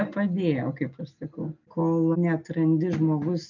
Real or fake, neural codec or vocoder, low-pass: real; none; 7.2 kHz